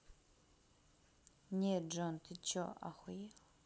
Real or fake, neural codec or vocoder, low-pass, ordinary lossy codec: real; none; none; none